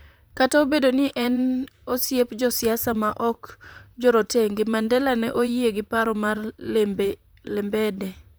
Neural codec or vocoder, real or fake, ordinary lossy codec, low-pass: vocoder, 44.1 kHz, 128 mel bands, Pupu-Vocoder; fake; none; none